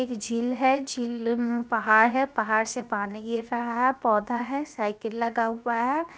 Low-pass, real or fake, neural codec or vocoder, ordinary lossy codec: none; fake; codec, 16 kHz, 0.7 kbps, FocalCodec; none